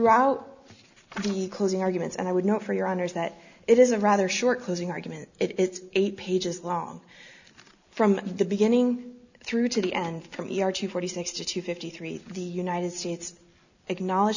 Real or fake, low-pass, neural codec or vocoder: real; 7.2 kHz; none